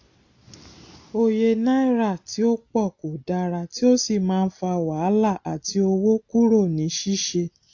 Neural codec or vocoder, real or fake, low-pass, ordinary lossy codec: none; real; 7.2 kHz; AAC, 48 kbps